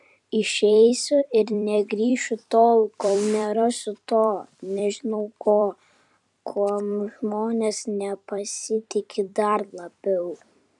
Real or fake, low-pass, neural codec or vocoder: fake; 10.8 kHz; vocoder, 44.1 kHz, 128 mel bands every 256 samples, BigVGAN v2